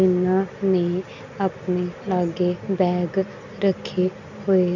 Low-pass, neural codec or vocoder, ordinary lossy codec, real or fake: 7.2 kHz; none; none; real